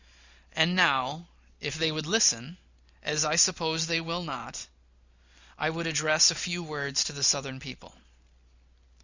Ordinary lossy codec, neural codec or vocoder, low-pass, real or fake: Opus, 64 kbps; none; 7.2 kHz; real